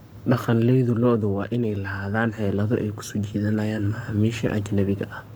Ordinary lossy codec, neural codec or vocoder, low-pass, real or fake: none; codec, 44.1 kHz, 7.8 kbps, Pupu-Codec; none; fake